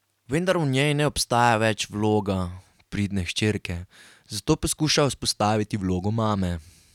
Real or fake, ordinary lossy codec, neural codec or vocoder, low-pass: real; none; none; 19.8 kHz